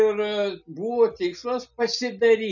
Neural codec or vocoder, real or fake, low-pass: none; real; 7.2 kHz